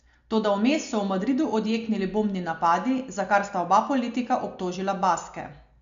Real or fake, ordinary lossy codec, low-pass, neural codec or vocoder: real; MP3, 64 kbps; 7.2 kHz; none